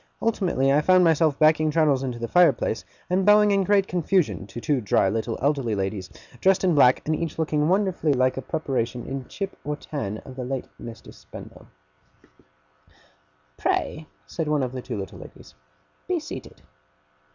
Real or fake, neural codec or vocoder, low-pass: real; none; 7.2 kHz